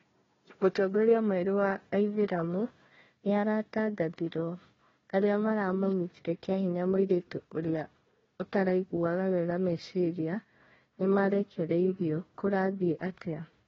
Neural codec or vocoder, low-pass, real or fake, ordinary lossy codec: codec, 16 kHz, 1 kbps, FunCodec, trained on Chinese and English, 50 frames a second; 7.2 kHz; fake; AAC, 24 kbps